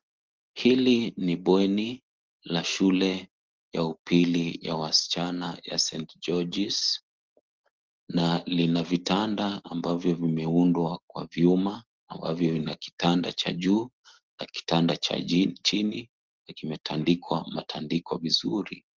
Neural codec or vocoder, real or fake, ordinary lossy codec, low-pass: none; real; Opus, 16 kbps; 7.2 kHz